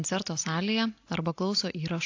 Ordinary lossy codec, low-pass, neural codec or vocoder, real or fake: AAC, 48 kbps; 7.2 kHz; none; real